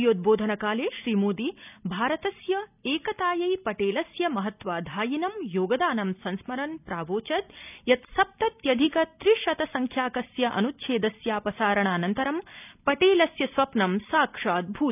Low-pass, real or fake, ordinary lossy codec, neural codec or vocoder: 3.6 kHz; real; none; none